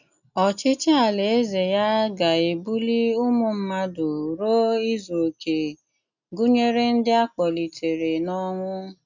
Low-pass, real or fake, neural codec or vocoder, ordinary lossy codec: 7.2 kHz; real; none; none